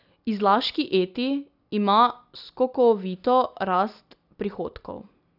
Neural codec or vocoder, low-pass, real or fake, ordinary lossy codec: none; 5.4 kHz; real; none